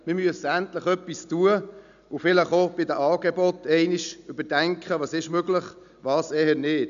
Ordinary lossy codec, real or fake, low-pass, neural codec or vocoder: none; real; 7.2 kHz; none